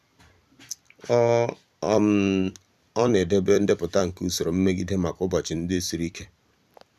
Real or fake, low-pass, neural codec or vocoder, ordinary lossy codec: fake; 14.4 kHz; vocoder, 44.1 kHz, 128 mel bands every 256 samples, BigVGAN v2; none